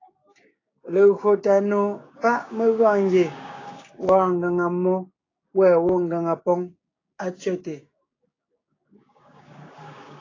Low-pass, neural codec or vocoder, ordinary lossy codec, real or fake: 7.2 kHz; codec, 16 kHz, 6 kbps, DAC; AAC, 32 kbps; fake